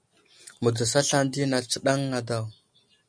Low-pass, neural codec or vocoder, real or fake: 9.9 kHz; none; real